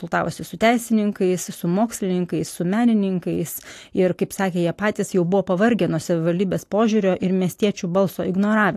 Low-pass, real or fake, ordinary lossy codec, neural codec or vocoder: 14.4 kHz; real; AAC, 64 kbps; none